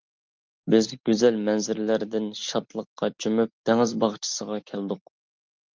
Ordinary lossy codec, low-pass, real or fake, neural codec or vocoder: Opus, 32 kbps; 7.2 kHz; real; none